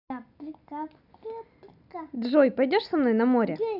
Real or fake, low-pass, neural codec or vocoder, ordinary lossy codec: real; 5.4 kHz; none; none